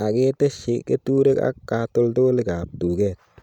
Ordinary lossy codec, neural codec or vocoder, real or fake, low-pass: none; none; real; 19.8 kHz